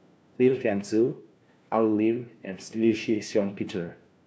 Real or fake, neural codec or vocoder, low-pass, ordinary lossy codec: fake; codec, 16 kHz, 1 kbps, FunCodec, trained on LibriTTS, 50 frames a second; none; none